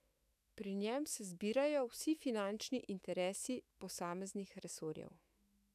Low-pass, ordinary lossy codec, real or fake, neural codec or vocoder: 14.4 kHz; none; fake; autoencoder, 48 kHz, 128 numbers a frame, DAC-VAE, trained on Japanese speech